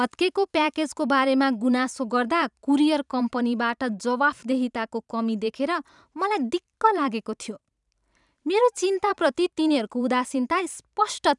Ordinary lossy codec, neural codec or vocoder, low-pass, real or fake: none; vocoder, 44.1 kHz, 128 mel bands every 512 samples, BigVGAN v2; 10.8 kHz; fake